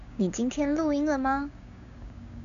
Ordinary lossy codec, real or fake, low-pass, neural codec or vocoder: MP3, 96 kbps; fake; 7.2 kHz; codec, 16 kHz, 6 kbps, DAC